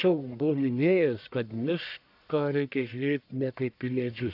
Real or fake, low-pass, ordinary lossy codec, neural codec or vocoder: fake; 5.4 kHz; AAC, 48 kbps; codec, 44.1 kHz, 1.7 kbps, Pupu-Codec